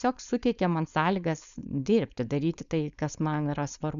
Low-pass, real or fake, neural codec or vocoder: 7.2 kHz; fake; codec, 16 kHz, 4.8 kbps, FACodec